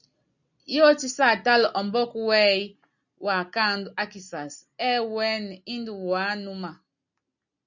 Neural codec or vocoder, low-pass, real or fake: none; 7.2 kHz; real